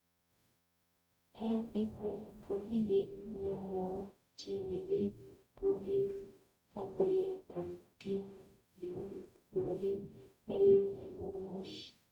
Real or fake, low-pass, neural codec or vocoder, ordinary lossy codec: fake; 19.8 kHz; codec, 44.1 kHz, 0.9 kbps, DAC; none